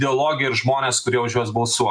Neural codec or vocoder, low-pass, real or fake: none; 9.9 kHz; real